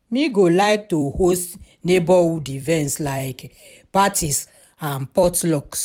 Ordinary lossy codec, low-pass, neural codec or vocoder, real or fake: none; 19.8 kHz; vocoder, 44.1 kHz, 128 mel bands every 256 samples, BigVGAN v2; fake